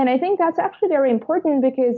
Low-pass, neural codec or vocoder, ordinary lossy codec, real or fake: 7.2 kHz; none; MP3, 64 kbps; real